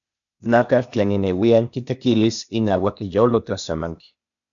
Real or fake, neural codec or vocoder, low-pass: fake; codec, 16 kHz, 0.8 kbps, ZipCodec; 7.2 kHz